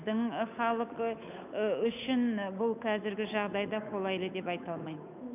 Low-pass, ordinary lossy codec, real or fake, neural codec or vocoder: 3.6 kHz; none; fake; codec, 16 kHz, 8 kbps, FunCodec, trained on Chinese and English, 25 frames a second